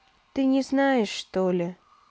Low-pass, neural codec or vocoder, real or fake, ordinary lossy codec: none; none; real; none